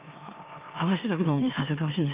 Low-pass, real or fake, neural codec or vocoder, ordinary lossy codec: 3.6 kHz; fake; autoencoder, 44.1 kHz, a latent of 192 numbers a frame, MeloTTS; Opus, 24 kbps